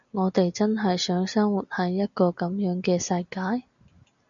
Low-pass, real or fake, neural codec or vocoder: 7.2 kHz; real; none